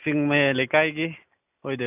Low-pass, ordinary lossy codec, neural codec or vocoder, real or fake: 3.6 kHz; none; none; real